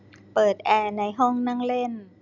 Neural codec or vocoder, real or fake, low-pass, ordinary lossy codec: none; real; 7.2 kHz; none